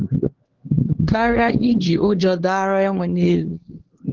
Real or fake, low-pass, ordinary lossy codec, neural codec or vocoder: fake; 7.2 kHz; Opus, 16 kbps; codec, 16 kHz, 2 kbps, X-Codec, HuBERT features, trained on LibriSpeech